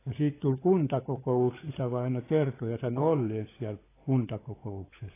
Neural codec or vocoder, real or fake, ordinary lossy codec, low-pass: codec, 16 kHz, 16 kbps, FunCodec, trained on LibriTTS, 50 frames a second; fake; AAC, 16 kbps; 3.6 kHz